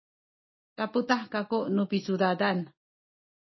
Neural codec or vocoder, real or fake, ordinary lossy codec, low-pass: none; real; MP3, 24 kbps; 7.2 kHz